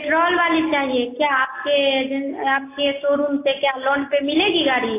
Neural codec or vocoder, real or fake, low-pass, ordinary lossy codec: none; real; 3.6 kHz; AAC, 24 kbps